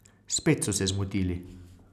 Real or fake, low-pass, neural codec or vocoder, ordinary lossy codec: real; 14.4 kHz; none; none